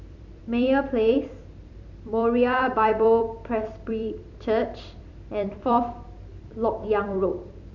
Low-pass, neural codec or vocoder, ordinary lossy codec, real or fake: 7.2 kHz; vocoder, 44.1 kHz, 128 mel bands every 256 samples, BigVGAN v2; none; fake